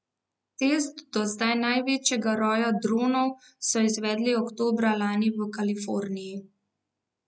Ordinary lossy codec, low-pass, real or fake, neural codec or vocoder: none; none; real; none